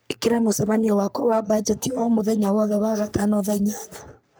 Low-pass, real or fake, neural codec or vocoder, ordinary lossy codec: none; fake; codec, 44.1 kHz, 3.4 kbps, Pupu-Codec; none